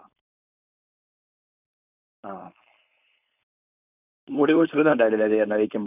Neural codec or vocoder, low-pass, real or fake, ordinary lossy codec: codec, 16 kHz, 4.8 kbps, FACodec; 3.6 kHz; fake; none